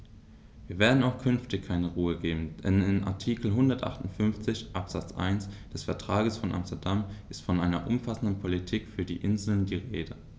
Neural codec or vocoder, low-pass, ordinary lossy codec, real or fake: none; none; none; real